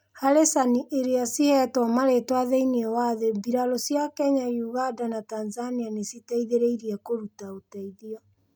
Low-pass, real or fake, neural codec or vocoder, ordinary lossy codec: none; real; none; none